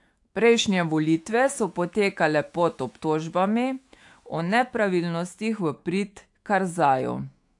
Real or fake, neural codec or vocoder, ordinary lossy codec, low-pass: fake; autoencoder, 48 kHz, 128 numbers a frame, DAC-VAE, trained on Japanese speech; AAC, 64 kbps; 10.8 kHz